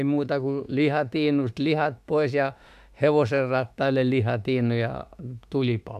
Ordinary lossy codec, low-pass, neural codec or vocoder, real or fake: AAC, 96 kbps; 14.4 kHz; autoencoder, 48 kHz, 32 numbers a frame, DAC-VAE, trained on Japanese speech; fake